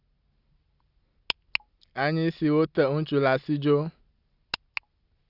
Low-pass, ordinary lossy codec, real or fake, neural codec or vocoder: 5.4 kHz; Opus, 64 kbps; real; none